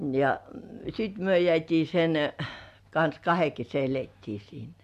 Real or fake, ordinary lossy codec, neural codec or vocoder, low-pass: real; MP3, 96 kbps; none; 14.4 kHz